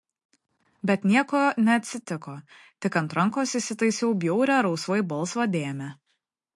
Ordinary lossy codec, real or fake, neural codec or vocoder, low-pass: MP3, 48 kbps; real; none; 10.8 kHz